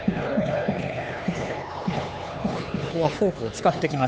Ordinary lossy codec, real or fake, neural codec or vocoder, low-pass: none; fake; codec, 16 kHz, 4 kbps, X-Codec, HuBERT features, trained on LibriSpeech; none